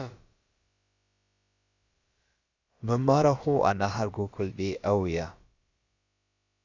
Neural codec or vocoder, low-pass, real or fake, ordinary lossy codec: codec, 16 kHz, about 1 kbps, DyCAST, with the encoder's durations; 7.2 kHz; fake; Opus, 64 kbps